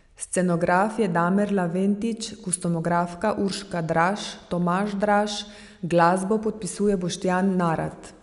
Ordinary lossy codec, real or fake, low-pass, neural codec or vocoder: none; real; 10.8 kHz; none